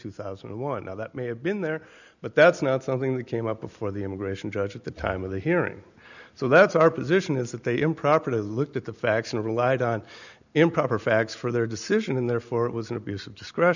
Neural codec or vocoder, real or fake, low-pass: none; real; 7.2 kHz